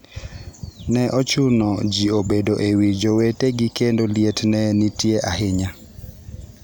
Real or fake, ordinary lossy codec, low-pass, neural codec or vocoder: real; none; none; none